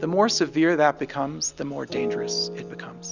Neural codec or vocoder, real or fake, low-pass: none; real; 7.2 kHz